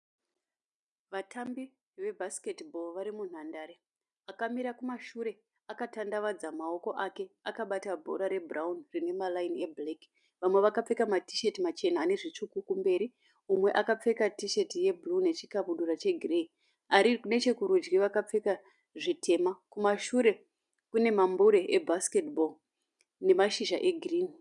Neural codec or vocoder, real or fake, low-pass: none; real; 10.8 kHz